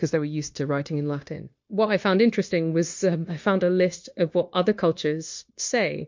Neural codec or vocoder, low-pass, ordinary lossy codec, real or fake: codec, 16 kHz, 0.9 kbps, LongCat-Audio-Codec; 7.2 kHz; MP3, 48 kbps; fake